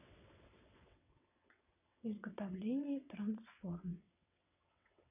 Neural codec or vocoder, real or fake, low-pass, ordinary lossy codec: vocoder, 22.05 kHz, 80 mel bands, WaveNeXt; fake; 3.6 kHz; none